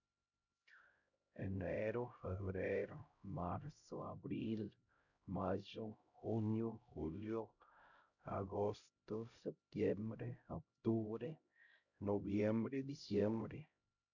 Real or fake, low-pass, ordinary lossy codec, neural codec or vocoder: fake; 7.2 kHz; none; codec, 16 kHz, 0.5 kbps, X-Codec, HuBERT features, trained on LibriSpeech